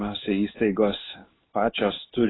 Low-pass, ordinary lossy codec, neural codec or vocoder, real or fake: 7.2 kHz; AAC, 16 kbps; codec, 16 kHz in and 24 kHz out, 1 kbps, XY-Tokenizer; fake